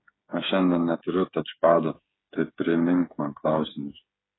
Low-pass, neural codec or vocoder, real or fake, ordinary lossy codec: 7.2 kHz; codec, 16 kHz, 8 kbps, FreqCodec, smaller model; fake; AAC, 16 kbps